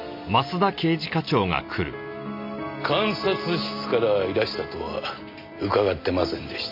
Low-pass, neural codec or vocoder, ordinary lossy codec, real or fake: 5.4 kHz; none; MP3, 48 kbps; real